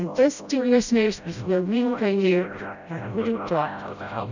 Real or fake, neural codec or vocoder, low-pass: fake; codec, 16 kHz, 0.5 kbps, FreqCodec, smaller model; 7.2 kHz